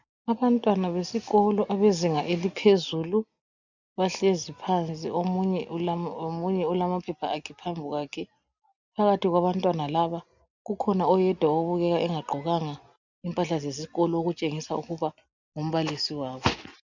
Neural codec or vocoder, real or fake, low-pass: none; real; 7.2 kHz